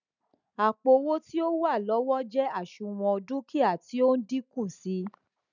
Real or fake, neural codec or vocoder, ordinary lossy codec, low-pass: real; none; none; 7.2 kHz